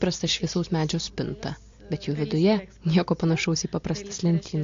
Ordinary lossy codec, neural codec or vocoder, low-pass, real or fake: AAC, 48 kbps; none; 7.2 kHz; real